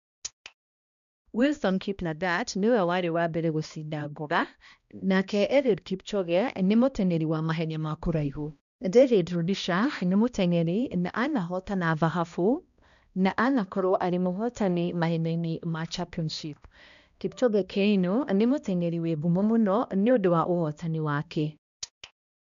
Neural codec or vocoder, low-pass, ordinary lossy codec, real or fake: codec, 16 kHz, 1 kbps, X-Codec, HuBERT features, trained on balanced general audio; 7.2 kHz; none; fake